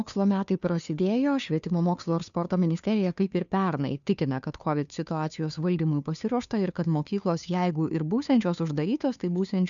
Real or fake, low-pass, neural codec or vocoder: fake; 7.2 kHz; codec, 16 kHz, 2 kbps, FunCodec, trained on Chinese and English, 25 frames a second